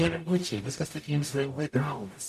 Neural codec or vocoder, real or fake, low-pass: codec, 44.1 kHz, 0.9 kbps, DAC; fake; 14.4 kHz